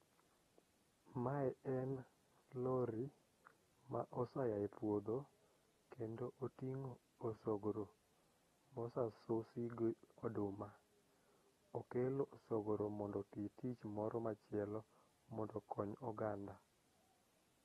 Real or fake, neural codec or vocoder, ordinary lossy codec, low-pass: real; none; AAC, 32 kbps; 19.8 kHz